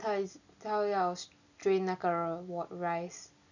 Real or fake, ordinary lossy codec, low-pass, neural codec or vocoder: real; none; 7.2 kHz; none